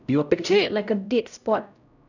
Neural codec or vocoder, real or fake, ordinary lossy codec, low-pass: codec, 16 kHz, 0.5 kbps, X-Codec, HuBERT features, trained on LibriSpeech; fake; none; 7.2 kHz